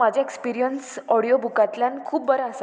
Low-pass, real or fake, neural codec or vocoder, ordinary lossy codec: none; real; none; none